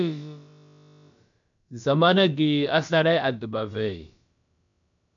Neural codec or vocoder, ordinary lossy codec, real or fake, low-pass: codec, 16 kHz, about 1 kbps, DyCAST, with the encoder's durations; MP3, 96 kbps; fake; 7.2 kHz